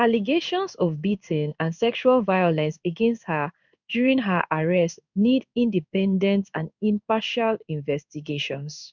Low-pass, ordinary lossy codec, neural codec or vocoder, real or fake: 7.2 kHz; none; codec, 16 kHz in and 24 kHz out, 1 kbps, XY-Tokenizer; fake